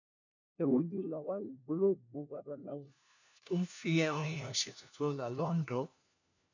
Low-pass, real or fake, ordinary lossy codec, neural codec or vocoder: 7.2 kHz; fake; none; codec, 16 kHz, 1 kbps, FunCodec, trained on LibriTTS, 50 frames a second